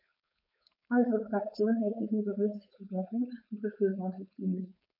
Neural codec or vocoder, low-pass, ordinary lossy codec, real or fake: codec, 16 kHz, 4.8 kbps, FACodec; 5.4 kHz; none; fake